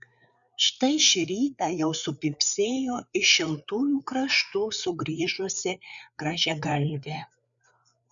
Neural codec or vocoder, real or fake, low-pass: codec, 16 kHz, 4 kbps, FreqCodec, larger model; fake; 7.2 kHz